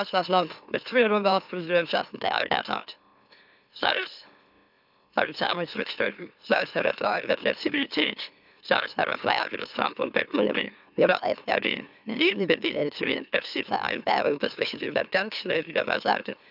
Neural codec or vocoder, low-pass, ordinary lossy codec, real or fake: autoencoder, 44.1 kHz, a latent of 192 numbers a frame, MeloTTS; 5.4 kHz; none; fake